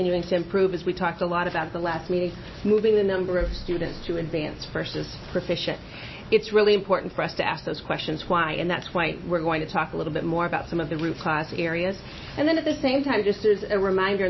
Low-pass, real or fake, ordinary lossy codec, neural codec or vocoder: 7.2 kHz; real; MP3, 24 kbps; none